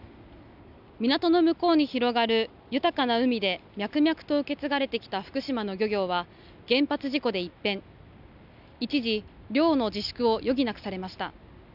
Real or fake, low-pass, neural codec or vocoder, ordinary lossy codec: real; 5.4 kHz; none; none